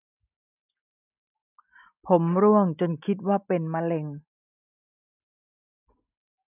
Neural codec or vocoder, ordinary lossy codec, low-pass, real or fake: none; none; 3.6 kHz; real